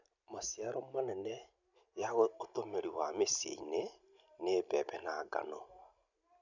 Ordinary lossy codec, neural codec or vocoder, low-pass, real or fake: none; none; 7.2 kHz; real